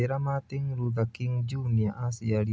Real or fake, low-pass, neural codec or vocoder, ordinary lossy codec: real; none; none; none